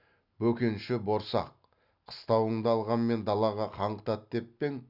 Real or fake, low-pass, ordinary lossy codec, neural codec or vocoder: real; 5.4 kHz; none; none